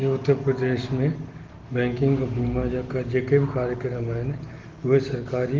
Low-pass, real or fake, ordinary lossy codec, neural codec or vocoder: 7.2 kHz; real; Opus, 16 kbps; none